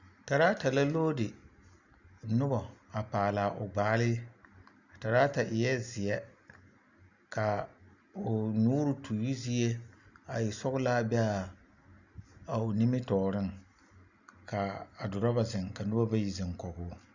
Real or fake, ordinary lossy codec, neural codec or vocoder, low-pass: real; Opus, 64 kbps; none; 7.2 kHz